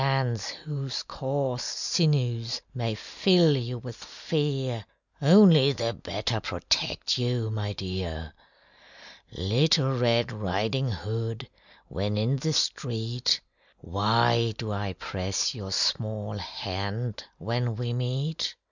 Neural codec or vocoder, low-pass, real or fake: none; 7.2 kHz; real